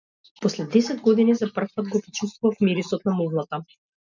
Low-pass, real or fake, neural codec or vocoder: 7.2 kHz; real; none